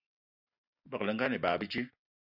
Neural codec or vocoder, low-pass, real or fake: none; 5.4 kHz; real